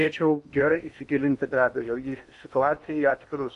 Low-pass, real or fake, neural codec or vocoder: 10.8 kHz; fake; codec, 16 kHz in and 24 kHz out, 0.8 kbps, FocalCodec, streaming, 65536 codes